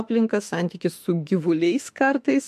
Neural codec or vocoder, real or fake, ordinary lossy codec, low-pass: autoencoder, 48 kHz, 32 numbers a frame, DAC-VAE, trained on Japanese speech; fake; MP3, 64 kbps; 14.4 kHz